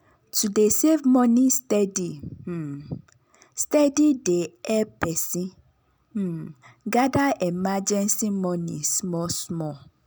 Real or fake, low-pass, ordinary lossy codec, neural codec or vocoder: real; none; none; none